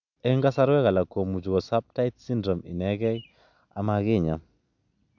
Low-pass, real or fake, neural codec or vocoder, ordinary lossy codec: 7.2 kHz; real; none; none